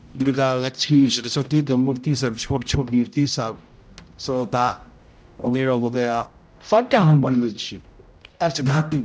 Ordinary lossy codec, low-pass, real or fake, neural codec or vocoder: none; none; fake; codec, 16 kHz, 0.5 kbps, X-Codec, HuBERT features, trained on general audio